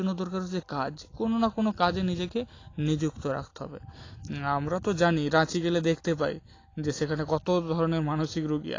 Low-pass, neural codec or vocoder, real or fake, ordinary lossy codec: 7.2 kHz; none; real; AAC, 32 kbps